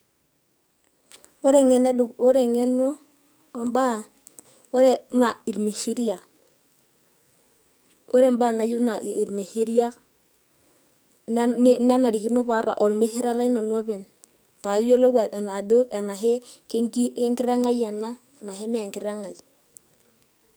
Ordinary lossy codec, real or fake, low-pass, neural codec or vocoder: none; fake; none; codec, 44.1 kHz, 2.6 kbps, SNAC